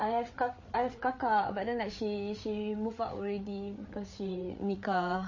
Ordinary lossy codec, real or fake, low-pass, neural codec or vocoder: MP3, 32 kbps; fake; 7.2 kHz; codec, 16 kHz, 8 kbps, FreqCodec, larger model